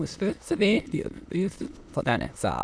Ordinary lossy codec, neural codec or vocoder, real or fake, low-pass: none; autoencoder, 22.05 kHz, a latent of 192 numbers a frame, VITS, trained on many speakers; fake; none